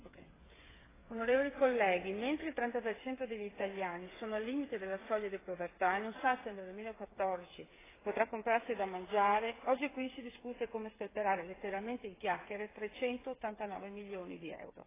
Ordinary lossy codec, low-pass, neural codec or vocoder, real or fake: AAC, 16 kbps; 3.6 kHz; codec, 16 kHz, 8 kbps, FreqCodec, smaller model; fake